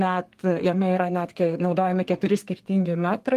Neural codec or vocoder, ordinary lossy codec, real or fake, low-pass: codec, 32 kHz, 1.9 kbps, SNAC; Opus, 24 kbps; fake; 14.4 kHz